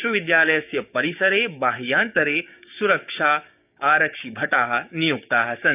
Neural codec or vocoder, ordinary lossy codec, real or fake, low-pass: codec, 16 kHz, 6 kbps, DAC; none; fake; 3.6 kHz